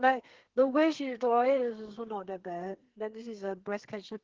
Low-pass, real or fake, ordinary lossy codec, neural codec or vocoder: 7.2 kHz; fake; Opus, 16 kbps; codec, 32 kHz, 1.9 kbps, SNAC